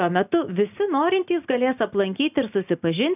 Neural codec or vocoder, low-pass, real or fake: none; 3.6 kHz; real